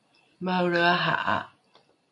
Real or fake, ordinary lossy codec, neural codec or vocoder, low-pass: real; MP3, 64 kbps; none; 10.8 kHz